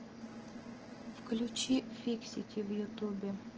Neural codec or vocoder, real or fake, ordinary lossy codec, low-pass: none; real; Opus, 16 kbps; 7.2 kHz